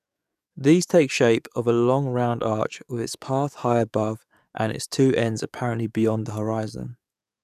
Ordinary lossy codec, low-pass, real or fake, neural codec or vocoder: none; 14.4 kHz; fake; codec, 44.1 kHz, 7.8 kbps, DAC